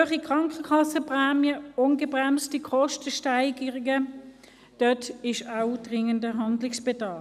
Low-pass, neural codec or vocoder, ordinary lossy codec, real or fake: 14.4 kHz; none; none; real